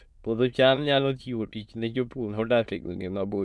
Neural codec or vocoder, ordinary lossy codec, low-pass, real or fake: autoencoder, 22.05 kHz, a latent of 192 numbers a frame, VITS, trained on many speakers; none; none; fake